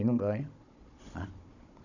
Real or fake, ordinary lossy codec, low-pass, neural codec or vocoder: fake; none; 7.2 kHz; codec, 16 kHz, 16 kbps, FunCodec, trained on Chinese and English, 50 frames a second